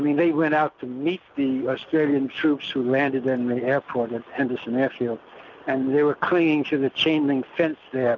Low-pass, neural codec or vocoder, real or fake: 7.2 kHz; none; real